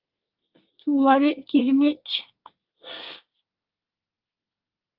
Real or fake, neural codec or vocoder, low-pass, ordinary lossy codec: fake; codec, 24 kHz, 1 kbps, SNAC; 5.4 kHz; Opus, 32 kbps